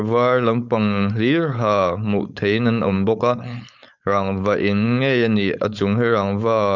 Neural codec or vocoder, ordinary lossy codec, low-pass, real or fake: codec, 16 kHz, 4.8 kbps, FACodec; none; 7.2 kHz; fake